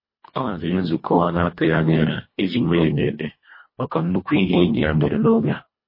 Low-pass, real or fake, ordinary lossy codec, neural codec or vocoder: 5.4 kHz; fake; MP3, 24 kbps; codec, 24 kHz, 1.5 kbps, HILCodec